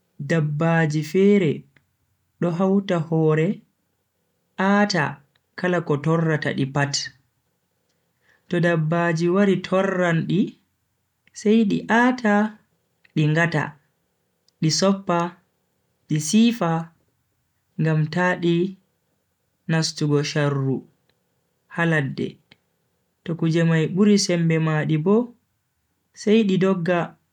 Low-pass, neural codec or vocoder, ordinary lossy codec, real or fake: 19.8 kHz; none; none; real